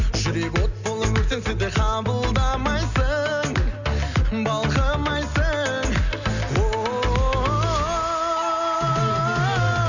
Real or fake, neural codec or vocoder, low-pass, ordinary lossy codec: real; none; 7.2 kHz; none